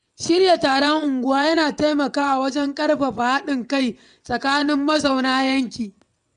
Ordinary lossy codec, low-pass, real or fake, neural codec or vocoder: MP3, 96 kbps; 9.9 kHz; fake; vocoder, 22.05 kHz, 80 mel bands, WaveNeXt